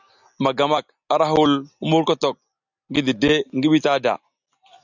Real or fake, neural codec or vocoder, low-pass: real; none; 7.2 kHz